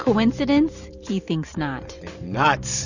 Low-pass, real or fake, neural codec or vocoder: 7.2 kHz; fake; vocoder, 44.1 kHz, 128 mel bands every 512 samples, BigVGAN v2